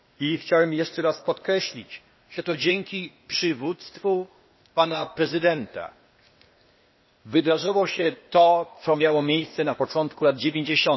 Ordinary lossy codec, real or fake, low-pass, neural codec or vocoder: MP3, 24 kbps; fake; 7.2 kHz; codec, 16 kHz, 0.8 kbps, ZipCodec